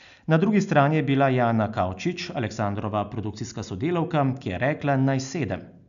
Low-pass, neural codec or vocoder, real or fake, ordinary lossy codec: 7.2 kHz; none; real; none